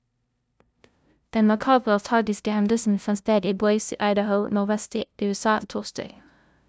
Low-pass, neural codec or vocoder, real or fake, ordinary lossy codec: none; codec, 16 kHz, 0.5 kbps, FunCodec, trained on LibriTTS, 25 frames a second; fake; none